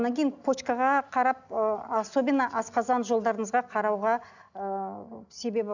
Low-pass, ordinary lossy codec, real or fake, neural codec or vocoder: 7.2 kHz; none; real; none